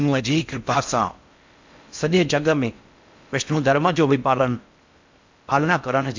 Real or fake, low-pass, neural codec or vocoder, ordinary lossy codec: fake; 7.2 kHz; codec, 16 kHz in and 24 kHz out, 0.6 kbps, FocalCodec, streaming, 4096 codes; MP3, 64 kbps